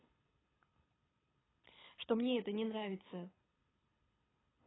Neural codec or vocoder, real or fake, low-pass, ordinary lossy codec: codec, 24 kHz, 6 kbps, HILCodec; fake; 7.2 kHz; AAC, 16 kbps